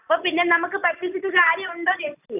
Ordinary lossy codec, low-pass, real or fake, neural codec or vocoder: none; 3.6 kHz; real; none